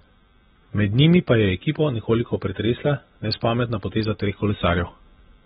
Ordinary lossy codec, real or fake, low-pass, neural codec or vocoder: AAC, 16 kbps; real; 14.4 kHz; none